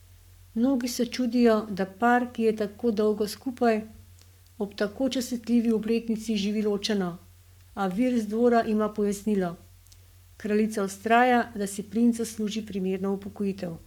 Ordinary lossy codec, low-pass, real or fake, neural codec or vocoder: none; 19.8 kHz; fake; codec, 44.1 kHz, 7.8 kbps, Pupu-Codec